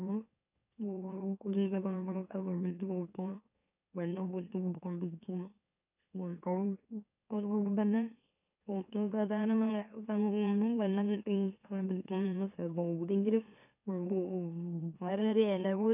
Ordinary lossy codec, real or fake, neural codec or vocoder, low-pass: none; fake; autoencoder, 44.1 kHz, a latent of 192 numbers a frame, MeloTTS; 3.6 kHz